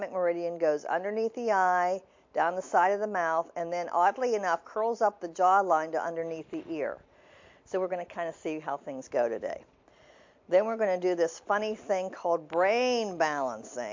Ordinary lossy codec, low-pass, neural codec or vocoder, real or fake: MP3, 48 kbps; 7.2 kHz; none; real